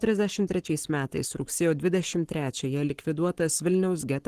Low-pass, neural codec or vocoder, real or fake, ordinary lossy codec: 14.4 kHz; codec, 44.1 kHz, 7.8 kbps, Pupu-Codec; fake; Opus, 16 kbps